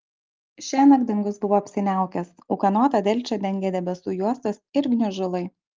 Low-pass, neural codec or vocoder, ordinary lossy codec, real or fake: 7.2 kHz; none; Opus, 32 kbps; real